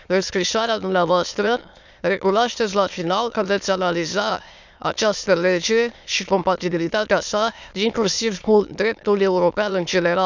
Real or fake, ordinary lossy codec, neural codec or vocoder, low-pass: fake; none; autoencoder, 22.05 kHz, a latent of 192 numbers a frame, VITS, trained on many speakers; 7.2 kHz